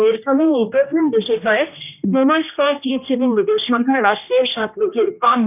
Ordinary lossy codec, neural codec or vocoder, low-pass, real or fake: none; codec, 16 kHz, 1 kbps, X-Codec, HuBERT features, trained on general audio; 3.6 kHz; fake